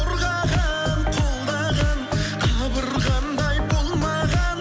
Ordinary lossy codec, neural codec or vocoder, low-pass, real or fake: none; none; none; real